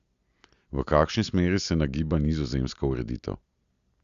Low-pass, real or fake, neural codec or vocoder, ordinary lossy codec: 7.2 kHz; real; none; Opus, 64 kbps